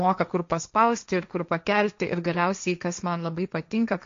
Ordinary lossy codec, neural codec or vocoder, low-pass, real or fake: MP3, 48 kbps; codec, 16 kHz, 1.1 kbps, Voila-Tokenizer; 7.2 kHz; fake